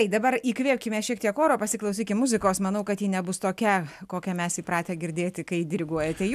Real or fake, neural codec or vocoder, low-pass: real; none; 14.4 kHz